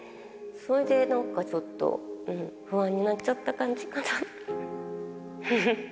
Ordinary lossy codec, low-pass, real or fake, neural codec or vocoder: none; none; real; none